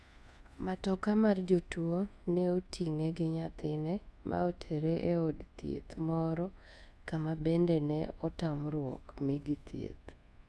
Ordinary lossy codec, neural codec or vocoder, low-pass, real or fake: none; codec, 24 kHz, 1.2 kbps, DualCodec; none; fake